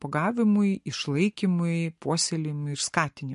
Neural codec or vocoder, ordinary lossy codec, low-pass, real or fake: none; MP3, 48 kbps; 10.8 kHz; real